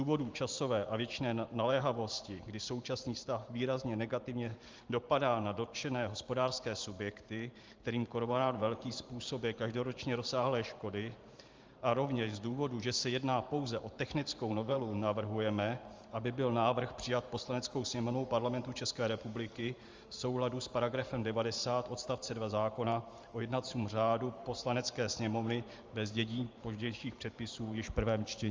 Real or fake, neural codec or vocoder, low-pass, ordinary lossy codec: fake; vocoder, 44.1 kHz, 128 mel bands every 512 samples, BigVGAN v2; 7.2 kHz; Opus, 32 kbps